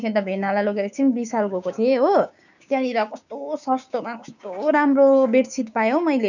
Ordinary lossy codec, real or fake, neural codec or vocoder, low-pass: none; fake; codec, 16 kHz, 6 kbps, DAC; 7.2 kHz